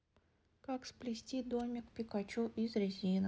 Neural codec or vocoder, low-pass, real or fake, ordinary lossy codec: none; none; real; none